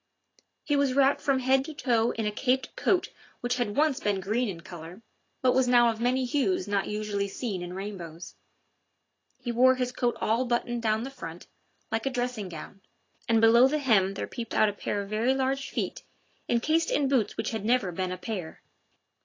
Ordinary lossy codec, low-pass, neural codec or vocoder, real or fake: AAC, 32 kbps; 7.2 kHz; none; real